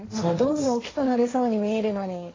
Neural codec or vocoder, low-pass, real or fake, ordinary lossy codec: codec, 16 kHz, 1.1 kbps, Voila-Tokenizer; 7.2 kHz; fake; AAC, 32 kbps